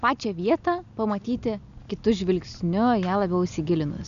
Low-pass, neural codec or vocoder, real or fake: 7.2 kHz; none; real